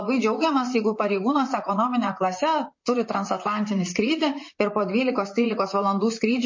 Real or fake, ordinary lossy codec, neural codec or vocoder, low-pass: fake; MP3, 32 kbps; vocoder, 44.1 kHz, 128 mel bands, Pupu-Vocoder; 7.2 kHz